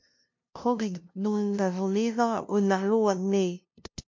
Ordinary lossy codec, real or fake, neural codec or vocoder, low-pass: MP3, 64 kbps; fake; codec, 16 kHz, 0.5 kbps, FunCodec, trained on LibriTTS, 25 frames a second; 7.2 kHz